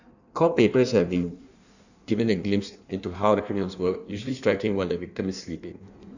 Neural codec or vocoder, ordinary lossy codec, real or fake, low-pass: codec, 16 kHz in and 24 kHz out, 1.1 kbps, FireRedTTS-2 codec; none; fake; 7.2 kHz